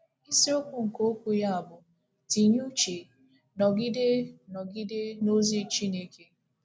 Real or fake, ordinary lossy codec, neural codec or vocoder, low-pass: real; none; none; none